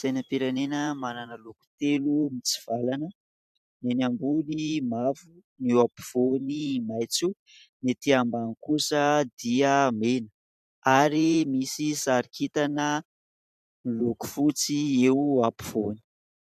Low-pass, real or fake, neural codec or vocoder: 14.4 kHz; fake; vocoder, 44.1 kHz, 128 mel bands every 512 samples, BigVGAN v2